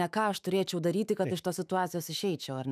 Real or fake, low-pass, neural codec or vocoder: fake; 14.4 kHz; autoencoder, 48 kHz, 128 numbers a frame, DAC-VAE, trained on Japanese speech